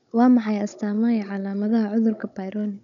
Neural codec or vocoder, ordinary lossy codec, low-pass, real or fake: codec, 16 kHz, 16 kbps, FunCodec, trained on Chinese and English, 50 frames a second; none; 7.2 kHz; fake